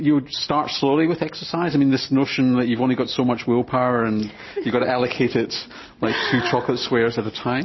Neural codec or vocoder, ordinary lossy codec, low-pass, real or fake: none; MP3, 24 kbps; 7.2 kHz; real